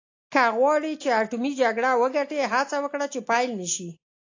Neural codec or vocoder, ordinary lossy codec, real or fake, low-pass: none; AAC, 48 kbps; real; 7.2 kHz